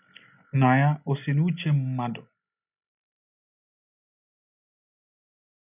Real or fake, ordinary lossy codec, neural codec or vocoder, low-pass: real; none; none; 3.6 kHz